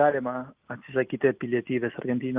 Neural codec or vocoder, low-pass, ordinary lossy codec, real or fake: none; 3.6 kHz; Opus, 32 kbps; real